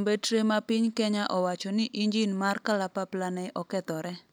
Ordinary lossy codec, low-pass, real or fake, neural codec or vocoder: none; none; real; none